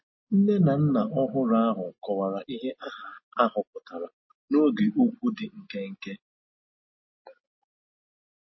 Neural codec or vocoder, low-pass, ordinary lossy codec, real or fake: none; 7.2 kHz; MP3, 24 kbps; real